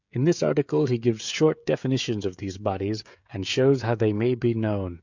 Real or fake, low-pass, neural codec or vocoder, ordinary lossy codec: fake; 7.2 kHz; codec, 16 kHz, 16 kbps, FreqCodec, smaller model; MP3, 64 kbps